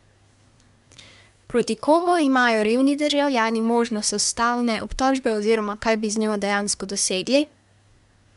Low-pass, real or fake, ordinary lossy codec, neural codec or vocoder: 10.8 kHz; fake; none; codec, 24 kHz, 1 kbps, SNAC